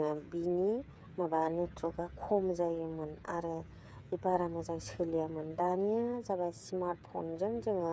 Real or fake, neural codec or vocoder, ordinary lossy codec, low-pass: fake; codec, 16 kHz, 8 kbps, FreqCodec, smaller model; none; none